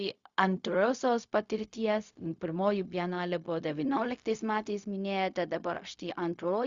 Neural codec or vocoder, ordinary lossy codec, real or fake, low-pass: codec, 16 kHz, 0.4 kbps, LongCat-Audio-Codec; Opus, 64 kbps; fake; 7.2 kHz